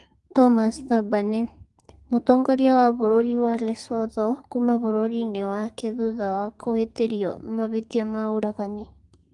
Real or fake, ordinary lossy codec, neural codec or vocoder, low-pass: fake; Opus, 24 kbps; codec, 32 kHz, 1.9 kbps, SNAC; 10.8 kHz